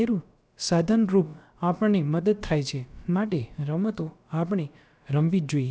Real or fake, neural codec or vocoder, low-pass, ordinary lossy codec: fake; codec, 16 kHz, about 1 kbps, DyCAST, with the encoder's durations; none; none